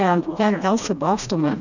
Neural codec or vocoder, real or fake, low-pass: codec, 16 kHz, 1 kbps, FreqCodec, smaller model; fake; 7.2 kHz